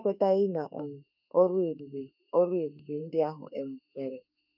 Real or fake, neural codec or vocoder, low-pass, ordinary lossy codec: fake; autoencoder, 48 kHz, 32 numbers a frame, DAC-VAE, trained on Japanese speech; 5.4 kHz; none